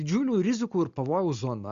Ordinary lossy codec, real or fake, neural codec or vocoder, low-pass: Opus, 64 kbps; real; none; 7.2 kHz